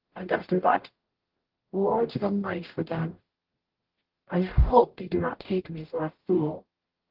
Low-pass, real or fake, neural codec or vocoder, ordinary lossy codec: 5.4 kHz; fake; codec, 44.1 kHz, 0.9 kbps, DAC; Opus, 16 kbps